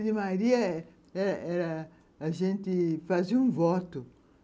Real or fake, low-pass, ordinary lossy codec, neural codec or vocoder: real; none; none; none